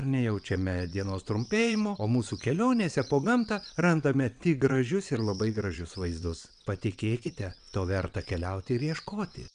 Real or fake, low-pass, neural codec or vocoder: fake; 9.9 kHz; vocoder, 22.05 kHz, 80 mel bands, WaveNeXt